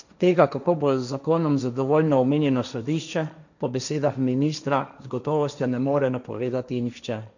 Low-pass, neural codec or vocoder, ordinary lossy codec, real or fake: 7.2 kHz; codec, 16 kHz, 1.1 kbps, Voila-Tokenizer; none; fake